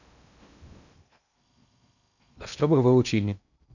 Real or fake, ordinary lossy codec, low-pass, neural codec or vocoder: fake; none; 7.2 kHz; codec, 16 kHz in and 24 kHz out, 0.8 kbps, FocalCodec, streaming, 65536 codes